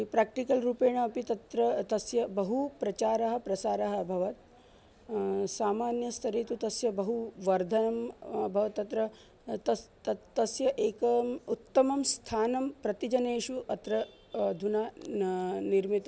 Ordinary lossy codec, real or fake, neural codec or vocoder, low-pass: none; real; none; none